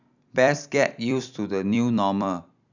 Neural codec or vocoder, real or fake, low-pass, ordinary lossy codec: vocoder, 44.1 kHz, 128 mel bands every 256 samples, BigVGAN v2; fake; 7.2 kHz; none